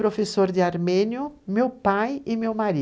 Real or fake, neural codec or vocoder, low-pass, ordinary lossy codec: real; none; none; none